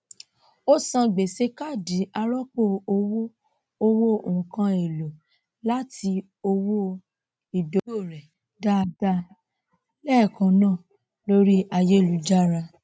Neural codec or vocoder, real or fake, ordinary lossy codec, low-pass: none; real; none; none